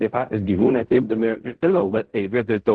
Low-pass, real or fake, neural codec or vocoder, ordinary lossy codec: 9.9 kHz; fake; codec, 16 kHz in and 24 kHz out, 0.4 kbps, LongCat-Audio-Codec, fine tuned four codebook decoder; Opus, 16 kbps